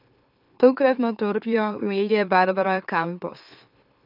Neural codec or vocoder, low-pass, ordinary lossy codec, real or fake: autoencoder, 44.1 kHz, a latent of 192 numbers a frame, MeloTTS; 5.4 kHz; none; fake